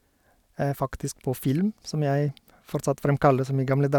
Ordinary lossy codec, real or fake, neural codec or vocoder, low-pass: none; real; none; 19.8 kHz